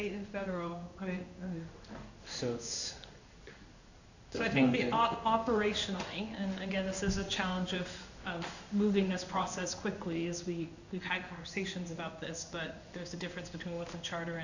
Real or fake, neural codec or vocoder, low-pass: fake; codec, 16 kHz in and 24 kHz out, 1 kbps, XY-Tokenizer; 7.2 kHz